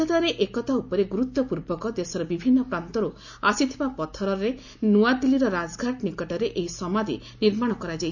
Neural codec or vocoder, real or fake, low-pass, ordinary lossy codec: none; real; 7.2 kHz; none